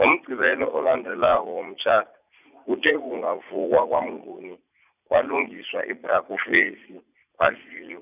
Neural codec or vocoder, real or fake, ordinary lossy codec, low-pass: vocoder, 44.1 kHz, 80 mel bands, Vocos; fake; none; 3.6 kHz